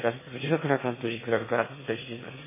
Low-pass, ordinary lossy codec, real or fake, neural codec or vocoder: 3.6 kHz; MP3, 16 kbps; fake; autoencoder, 22.05 kHz, a latent of 192 numbers a frame, VITS, trained on one speaker